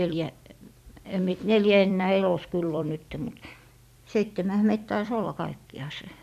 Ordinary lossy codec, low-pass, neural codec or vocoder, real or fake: none; 14.4 kHz; vocoder, 44.1 kHz, 128 mel bands every 256 samples, BigVGAN v2; fake